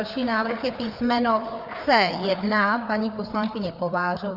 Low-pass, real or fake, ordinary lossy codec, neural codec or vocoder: 5.4 kHz; fake; Opus, 64 kbps; codec, 16 kHz, 4 kbps, FreqCodec, larger model